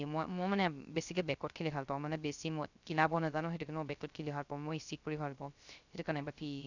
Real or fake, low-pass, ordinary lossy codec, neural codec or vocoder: fake; 7.2 kHz; none; codec, 16 kHz, 0.3 kbps, FocalCodec